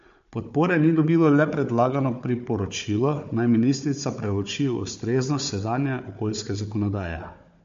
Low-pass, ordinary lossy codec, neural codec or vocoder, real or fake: 7.2 kHz; MP3, 48 kbps; codec, 16 kHz, 4 kbps, FunCodec, trained on Chinese and English, 50 frames a second; fake